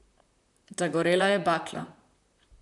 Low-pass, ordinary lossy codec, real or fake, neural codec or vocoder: 10.8 kHz; none; fake; vocoder, 44.1 kHz, 128 mel bands, Pupu-Vocoder